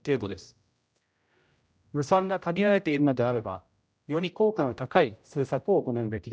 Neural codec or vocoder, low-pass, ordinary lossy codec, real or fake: codec, 16 kHz, 0.5 kbps, X-Codec, HuBERT features, trained on general audio; none; none; fake